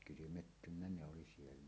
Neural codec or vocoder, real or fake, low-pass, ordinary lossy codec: none; real; none; none